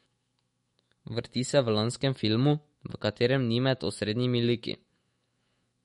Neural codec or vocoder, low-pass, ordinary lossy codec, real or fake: autoencoder, 48 kHz, 128 numbers a frame, DAC-VAE, trained on Japanese speech; 19.8 kHz; MP3, 48 kbps; fake